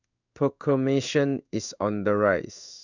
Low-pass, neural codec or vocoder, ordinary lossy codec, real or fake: 7.2 kHz; codec, 16 kHz in and 24 kHz out, 1 kbps, XY-Tokenizer; none; fake